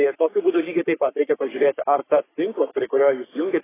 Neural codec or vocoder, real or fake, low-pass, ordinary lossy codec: codec, 44.1 kHz, 3.4 kbps, Pupu-Codec; fake; 3.6 kHz; AAC, 16 kbps